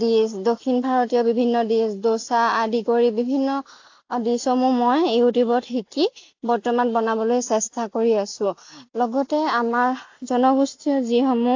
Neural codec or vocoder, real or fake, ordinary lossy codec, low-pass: none; real; AAC, 48 kbps; 7.2 kHz